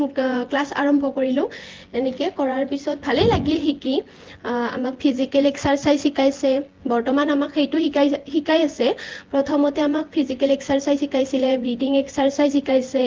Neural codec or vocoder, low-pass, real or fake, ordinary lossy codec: vocoder, 24 kHz, 100 mel bands, Vocos; 7.2 kHz; fake; Opus, 16 kbps